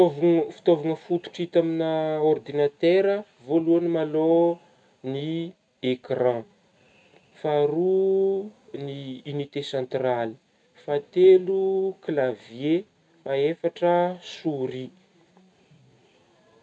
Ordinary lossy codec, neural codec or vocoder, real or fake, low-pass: none; none; real; 9.9 kHz